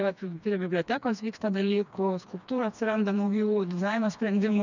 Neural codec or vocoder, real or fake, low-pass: codec, 16 kHz, 2 kbps, FreqCodec, smaller model; fake; 7.2 kHz